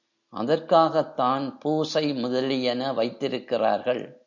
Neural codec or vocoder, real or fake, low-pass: none; real; 7.2 kHz